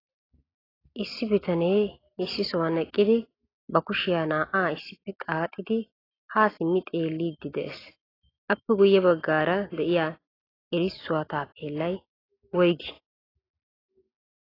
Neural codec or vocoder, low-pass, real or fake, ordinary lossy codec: none; 5.4 kHz; real; AAC, 24 kbps